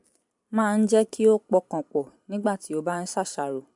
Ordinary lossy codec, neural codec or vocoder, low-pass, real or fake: MP3, 64 kbps; vocoder, 44.1 kHz, 128 mel bands every 512 samples, BigVGAN v2; 10.8 kHz; fake